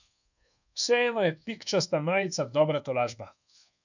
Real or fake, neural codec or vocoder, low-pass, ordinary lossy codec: fake; codec, 24 kHz, 1.2 kbps, DualCodec; 7.2 kHz; none